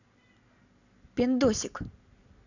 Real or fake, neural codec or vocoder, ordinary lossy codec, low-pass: real; none; none; 7.2 kHz